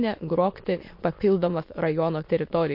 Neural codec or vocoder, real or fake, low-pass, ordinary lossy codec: autoencoder, 22.05 kHz, a latent of 192 numbers a frame, VITS, trained on many speakers; fake; 5.4 kHz; MP3, 32 kbps